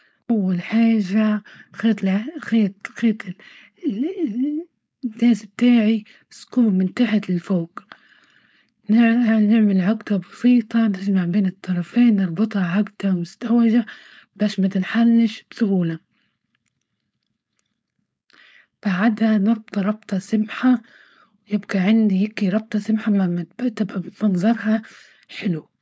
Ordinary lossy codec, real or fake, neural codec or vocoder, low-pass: none; fake; codec, 16 kHz, 4.8 kbps, FACodec; none